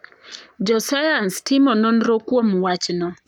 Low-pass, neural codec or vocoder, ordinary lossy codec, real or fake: 19.8 kHz; codec, 44.1 kHz, 7.8 kbps, Pupu-Codec; none; fake